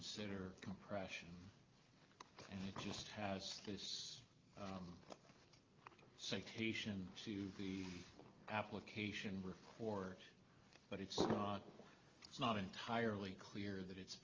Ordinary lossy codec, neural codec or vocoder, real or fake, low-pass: Opus, 32 kbps; none; real; 7.2 kHz